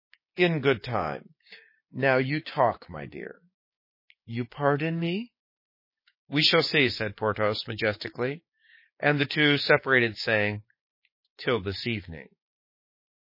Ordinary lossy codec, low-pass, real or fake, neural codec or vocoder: MP3, 24 kbps; 5.4 kHz; fake; codec, 16 kHz, 6 kbps, DAC